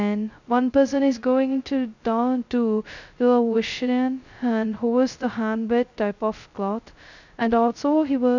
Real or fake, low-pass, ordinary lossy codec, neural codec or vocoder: fake; 7.2 kHz; none; codec, 16 kHz, 0.2 kbps, FocalCodec